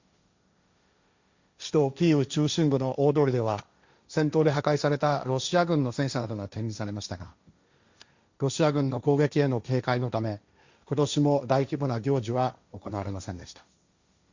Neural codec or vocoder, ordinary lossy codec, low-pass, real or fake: codec, 16 kHz, 1.1 kbps, Voila-Tokenizer; Opus, 64 kbps; 7.2 kHz; fake